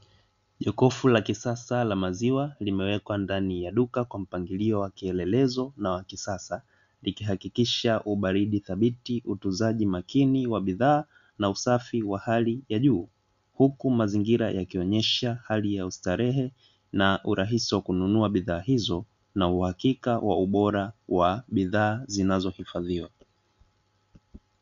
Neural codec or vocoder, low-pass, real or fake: none; 7.2 kHz; real